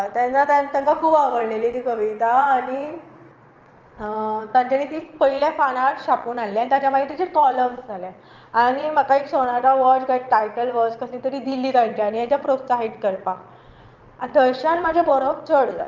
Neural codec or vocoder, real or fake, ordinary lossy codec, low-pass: vocoder, 22.05 kHz, 80 mel bands, Vocos; fake; Opus, 24 kbps; 7.2 kHz